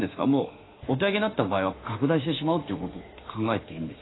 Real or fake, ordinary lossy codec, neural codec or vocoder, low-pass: fake; AAC, 16 kbps; autoencoder, 48 kHz, 32 numbers a frame, DAC-VAE, trained on Japanese speech; 7.2 kHz